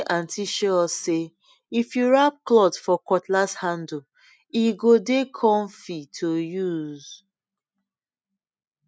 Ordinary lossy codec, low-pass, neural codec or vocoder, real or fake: none; none; none; real